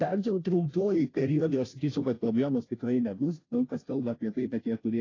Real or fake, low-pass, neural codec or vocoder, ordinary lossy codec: fake; 7.2 kHz; codec, 16 kHz, 0.5 kbps, FunCodec, trained on Chinese and English, 25 frames a second; AAC, 32 kbps